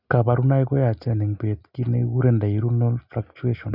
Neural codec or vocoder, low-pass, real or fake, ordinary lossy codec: none; 5.4 kHz; real; Opus, 64 kbps